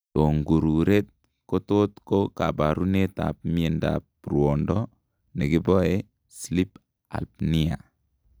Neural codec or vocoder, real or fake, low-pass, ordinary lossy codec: none; real; none; none